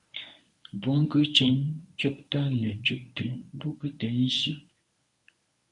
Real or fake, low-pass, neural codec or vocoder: fake; 10.8 kHz; codec, 24 kHz, 0.9 kbps, WavTokenizer, medium speech release version 1